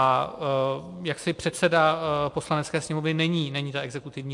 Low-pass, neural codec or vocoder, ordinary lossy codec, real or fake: 10.8 kHz; none; MP3, 64 kbps; real